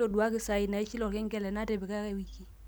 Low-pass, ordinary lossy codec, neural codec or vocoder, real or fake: none; none; none; real